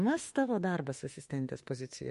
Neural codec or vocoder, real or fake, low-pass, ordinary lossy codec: autoencoder, 48 kHz, 32 numbers a frame, DAC-VAE, trained on Japanese speech; fake; 14.4 kHz; MP3, 48 kbps